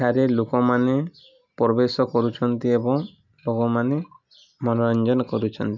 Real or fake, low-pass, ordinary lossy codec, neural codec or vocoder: real; 7.2 kHz; none; none